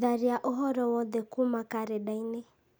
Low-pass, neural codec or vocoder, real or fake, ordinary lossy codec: none; none; real; none